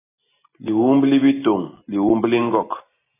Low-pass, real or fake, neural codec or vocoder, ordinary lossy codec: 3.6 kHz; real; none; AAC, 16 kbps